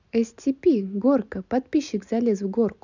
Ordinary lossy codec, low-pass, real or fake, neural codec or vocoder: none; 7.2 kHz; real; none